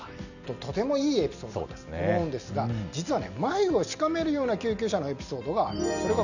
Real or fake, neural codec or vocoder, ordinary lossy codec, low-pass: real; none; none; 7.2 kHz